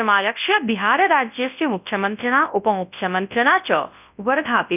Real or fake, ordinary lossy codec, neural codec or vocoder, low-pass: fake; none; codec, 24 kHz, 0.9 kbps, WavTokenizer, large speech release; 3.6 kHz